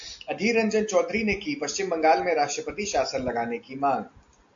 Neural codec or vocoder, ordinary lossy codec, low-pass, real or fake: none; AAC, 64 kbps; 7.2 kHz; real